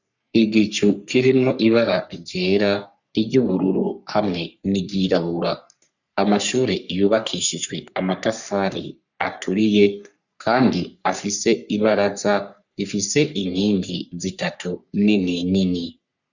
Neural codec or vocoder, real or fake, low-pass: codec, 44.1 kHz, 3.4 kbps, Pupu-Codec; fake; 7.2 kHz